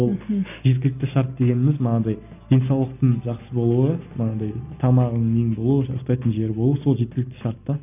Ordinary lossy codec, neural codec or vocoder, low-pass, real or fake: none; none; 3.6 kHz; real